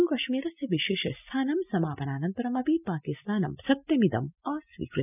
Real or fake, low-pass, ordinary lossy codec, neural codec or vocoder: real; 3.6 kHz; none; none